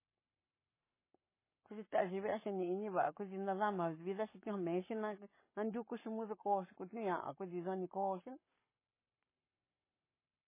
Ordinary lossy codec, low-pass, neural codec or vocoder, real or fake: MP3, 16 kbps; 3.6 kHz; codec, 44.1 kHz, 7.8 kbps, Pupu-Codec; fake